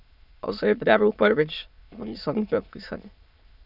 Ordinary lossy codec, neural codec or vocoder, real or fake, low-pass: none; autoencoder, 22.05 kHz, a latent of 192 numbers a frame, VITS, trained on many speakers; fake; 5.4 kHz